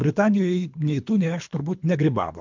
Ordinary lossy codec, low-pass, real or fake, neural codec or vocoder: MP3, 64 kbps; 7.2 kHz; fake; codec, 24 kHz, 3 kbps, HILCodec